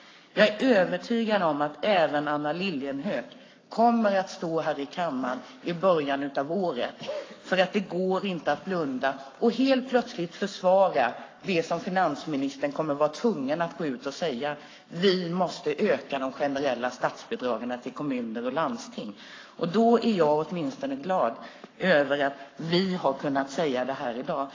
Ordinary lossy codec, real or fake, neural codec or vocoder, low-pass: AAC, 32 kbps; fake; codec, 44.1 kHz, 7.8 kbps, Pupu-Codec; 7.2 kHz